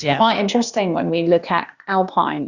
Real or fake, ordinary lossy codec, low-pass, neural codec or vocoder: fake; Opus, 64 kbps; 7.2 kHz; codec, 16 kHz, 0.8 kbps, ZipCodec